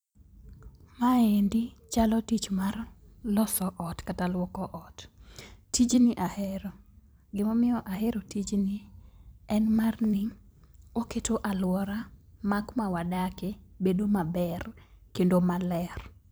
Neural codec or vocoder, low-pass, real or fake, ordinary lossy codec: vocoder, 44.1 kHz, 128 mel bands every 256 samples, BigVGAN v2; none; fake; none